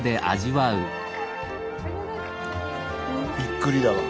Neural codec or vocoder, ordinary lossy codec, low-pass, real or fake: none; none; none; real